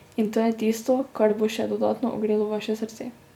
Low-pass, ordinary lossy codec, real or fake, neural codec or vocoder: 19.8 kHz; none; fake; vocoder, 44.1 kHz, 128 mel bands every 256 samples, BigVGAN v2